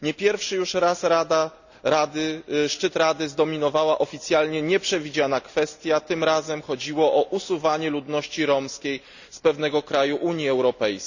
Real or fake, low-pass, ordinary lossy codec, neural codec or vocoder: real; 7.2 kHz; none; none